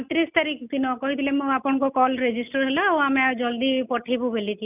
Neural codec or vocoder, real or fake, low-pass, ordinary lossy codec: none; real; 3.6 kHz; none